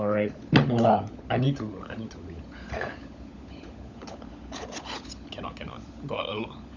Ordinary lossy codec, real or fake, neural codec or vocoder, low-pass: none; fake; codec, 16 kHz, 16 kbps, FunCodec, trained on LibriTTS, 50 frames a second; 7.2 kHz